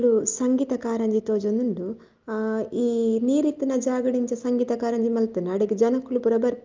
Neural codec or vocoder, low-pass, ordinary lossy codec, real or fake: none; 7.2 kHz; Opus, 32 kbps; real